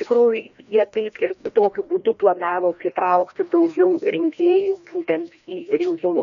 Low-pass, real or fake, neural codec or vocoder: 7.2 kHz; fake; codec, 16 kHz, 1 kbps, FreqCodec, larger model